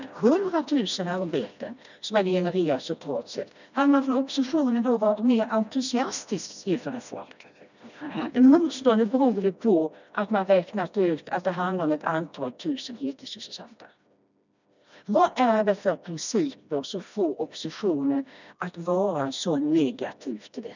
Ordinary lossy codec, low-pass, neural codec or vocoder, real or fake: none; 7.2 kHz; codec, 16 kHz, 1 kbps, FreqCodec, smaller model; fake